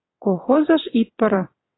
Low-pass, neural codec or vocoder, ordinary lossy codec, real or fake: 7.2 kHz; vocoder, 22.05 kHz, 80 mel bands, WaveNeXt; AAC, 16 kbps; fake